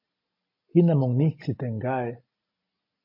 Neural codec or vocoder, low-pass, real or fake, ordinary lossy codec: none; 5.4 kHz; real; MP3, 32 kbps